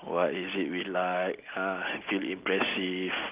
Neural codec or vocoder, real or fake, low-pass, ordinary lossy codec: none; real; 3.6 kHz; Opus, 24 kbps